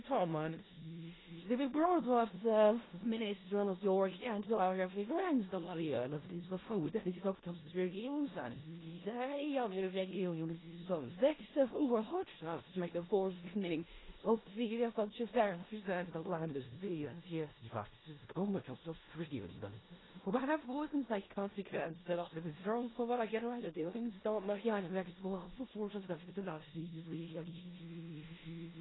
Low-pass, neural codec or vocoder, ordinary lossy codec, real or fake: 7.2 kHz; codec, 16 kHz in and 24 kHz out, 0.4 kbps, LongCat-Audio-Codec, four codebook decoder; AAC, 16 kbps; fake